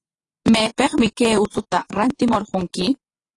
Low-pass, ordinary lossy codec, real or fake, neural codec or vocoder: 10.8 kHz; AAC, 32 kbps; fake; vocoder, 24 kHz, 100 mel bands, Vocos